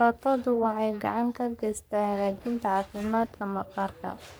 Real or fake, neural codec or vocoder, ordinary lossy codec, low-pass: fake; codec, 44.1 kHz, 3.4 kbps, Pupu-Codec; none; none